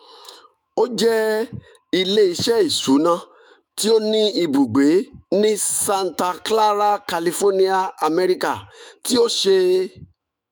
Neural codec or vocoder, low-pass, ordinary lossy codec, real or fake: autoencoder, 48 kHz, 128 numbers a frame, DAC-VAE, trained on Japanese speech; none; none; fake